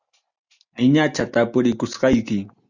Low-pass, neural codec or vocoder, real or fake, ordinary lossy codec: 7.2 kHz; none; real; Opus, 64 kbps